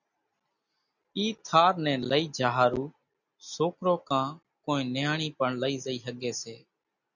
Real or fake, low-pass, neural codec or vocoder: real; 7.2 kHz; none